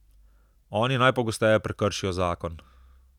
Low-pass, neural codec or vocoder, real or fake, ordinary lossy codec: 19.8 kHz; none; real; none